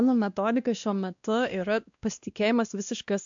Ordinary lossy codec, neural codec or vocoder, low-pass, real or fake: AAC, 64 kbps; codec, 16 kHz, 1 kbps, X-Codec, WavLM features, trained on Multilingual LibriSpeech; 7.2 kHz; fake